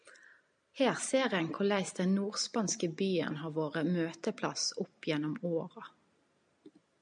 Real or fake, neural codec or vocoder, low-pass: real; none; 9.9 kHz